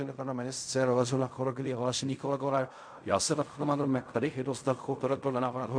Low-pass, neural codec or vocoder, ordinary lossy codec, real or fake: 9.9 kHz; codec, 16 kHz in and 24 kHz out, 0.4 kbps, LongCat-Audio-Codec, fine tuned four codebook decoder; MP3, 64 kbps; fake